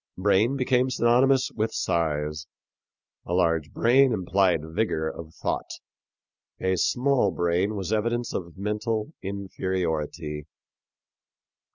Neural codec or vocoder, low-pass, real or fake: none; 7.2 kHz; real